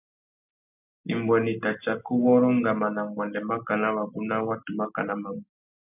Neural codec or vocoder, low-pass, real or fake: none; 3.6 kHz; real